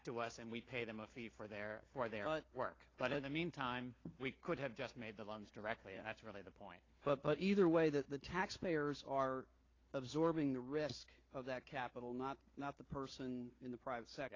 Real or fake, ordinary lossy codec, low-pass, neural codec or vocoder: fake; AAC, 32 kbps; 7.2 kHz; codec, 16 kHz, 4 kbps, FunCodec, trained on Chinese and English, 50 frames a second